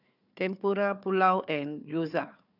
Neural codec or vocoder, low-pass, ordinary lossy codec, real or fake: codec, 16 kHz, 4 kbps, FunCodec, trained on Chinese and English, 50 frames a second; 5.4 kHz; none; fake